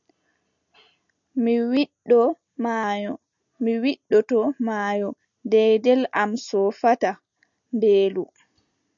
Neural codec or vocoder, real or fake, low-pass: none; real; 7.2 kHz